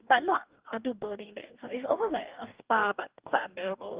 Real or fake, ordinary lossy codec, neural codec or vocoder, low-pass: fake; Opus, 32 kbps; codec, 44.1 kHz, 2.6 kbps, DAC; 3.6 kHz